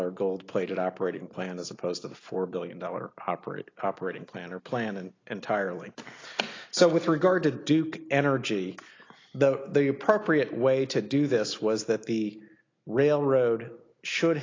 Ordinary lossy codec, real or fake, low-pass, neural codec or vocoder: AAC, 32 kbps; real; 7.2 kHz; none